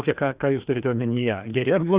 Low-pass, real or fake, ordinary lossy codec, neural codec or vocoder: 3.6 kHz; fake; Opus, 64 kbps; codec, 16 kHz, 2 kbps, FreqCodec, larger model